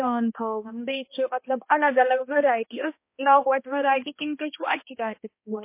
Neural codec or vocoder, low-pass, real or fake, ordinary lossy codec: codec, 16 kHz, 1 kbps, X-Codec, HuBERT features, trained on balanced general audio; 3.6 kHz; fake; MP3, 24 kbps